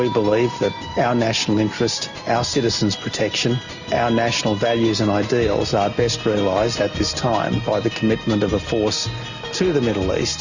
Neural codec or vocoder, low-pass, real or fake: none; 7.2 kHz; real